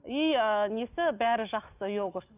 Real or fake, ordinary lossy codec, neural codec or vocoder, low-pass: real; none; none; 3.6 kHz